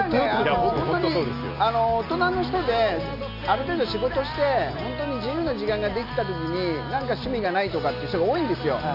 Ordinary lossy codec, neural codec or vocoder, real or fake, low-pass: none; none; real; 5.4 kHz